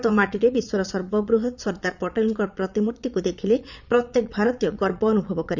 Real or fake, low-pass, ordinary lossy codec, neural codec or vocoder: fake; 7.2 kHz; none; vocoder, 22.05 kHz, 80 mel bands, Vocos